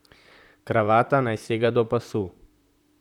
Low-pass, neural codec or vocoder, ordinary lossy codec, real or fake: 19.8 kHz; vocoder, 44.1 kHz, 128 mel bands, Pupu-Vocoder; none; fake